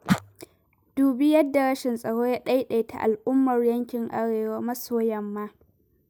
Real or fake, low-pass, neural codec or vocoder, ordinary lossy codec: real; none; none; none